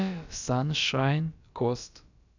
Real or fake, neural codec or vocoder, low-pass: fake; codec, 16 kHz, about 1 kbps, DyCAST, with the encoder's durations; 7.2 kHz